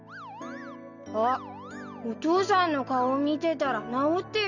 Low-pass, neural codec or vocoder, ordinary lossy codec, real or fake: 7.2 kHz; none; none; real